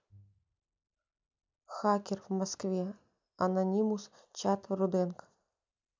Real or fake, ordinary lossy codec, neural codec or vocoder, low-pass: real; MP3, 64 kbps; none; 7.2 kHz